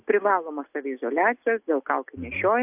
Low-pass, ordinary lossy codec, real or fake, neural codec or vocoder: 3.6 kHz; AAC, 32 kbps; real; none